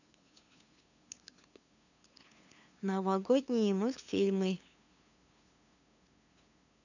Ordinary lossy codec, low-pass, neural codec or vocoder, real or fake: none; 7.2 kHz; codec, 16 kHz, 2 kbps, FunCodec, trained on LibriTTS, 25 frames a second; fake